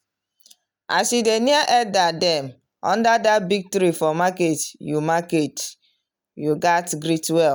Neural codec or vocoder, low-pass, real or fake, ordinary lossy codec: none; none; real; none